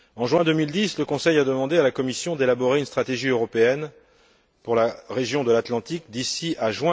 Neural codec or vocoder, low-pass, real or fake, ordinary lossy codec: none; none; real; none